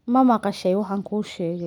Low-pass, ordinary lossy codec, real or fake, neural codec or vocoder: 19.8 kHz; none; real; none